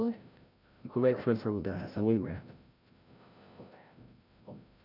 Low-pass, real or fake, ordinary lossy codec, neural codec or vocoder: 5.4 kHz; fake; none; codec, 16 kHz, 0.5 kbps, FreqCodec, larger model